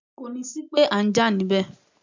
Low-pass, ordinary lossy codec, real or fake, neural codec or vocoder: 7.2 kHz; MP3, 64 kbps; real; none